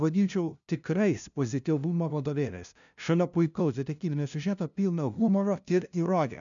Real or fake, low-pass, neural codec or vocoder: fake; 7.2 kHz; codec, 16 kHz, 0.5 kbps, FunCodec, trained on LibriTTS, 25 frames a second